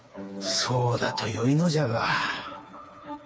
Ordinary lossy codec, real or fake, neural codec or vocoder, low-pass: none; fake; codec, 16 kHz, 4 kbps, FreqCodec, smaller model; none